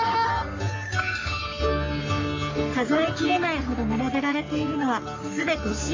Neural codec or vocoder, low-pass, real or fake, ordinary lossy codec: codec, 44.1 kHz, 3.4 kbps, Pupu-Codec; 7.2 kHz; fake; AAC, 48 kbps